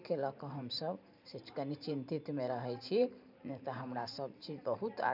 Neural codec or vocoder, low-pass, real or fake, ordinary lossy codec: vocoder, 44.1 kHz, 128 mel bands every 512 samples, BigVGAN v2; 5.4 kHz; fake; none